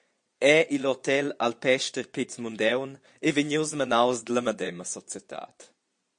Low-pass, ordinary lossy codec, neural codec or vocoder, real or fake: 9.9 kHz; MP3, 48 kbps; vocoder, 22.05 kHz, 80 mel bands, Vocos; fake